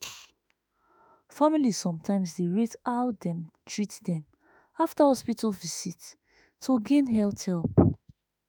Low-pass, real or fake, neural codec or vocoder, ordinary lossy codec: none; fake; autoencoder, 48 kHz, 32 numbers a frame, DAC-VAE, trained on Japanese speech; none